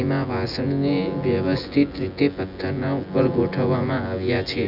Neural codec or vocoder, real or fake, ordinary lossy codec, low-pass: vocoder, 24 kHz, 100 mel bands, Vocos; fake; none; 5.4 kHz